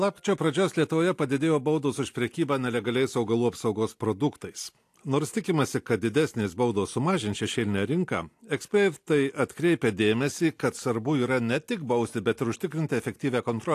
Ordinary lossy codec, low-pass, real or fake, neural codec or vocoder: AAC, 64 kbps; 14.4 kHz; real; none